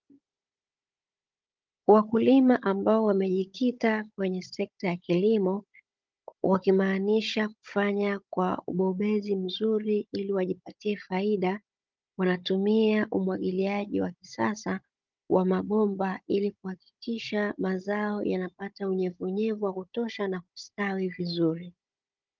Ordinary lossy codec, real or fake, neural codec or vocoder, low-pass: Opus, 32 kbps; fake; codec, 16 kHz, 16 kbps, FunCodec, trained on Chinese and English, 50 frames a second; 7.2 kHz